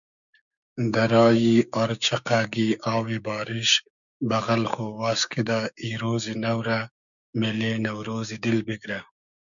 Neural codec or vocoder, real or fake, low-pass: codec, 16 kHz, 6 kbps, DAC; fake; 7.2 kHz